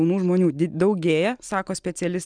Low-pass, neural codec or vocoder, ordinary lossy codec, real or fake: 9.9 kHz; none; Opus, 32 kbps; real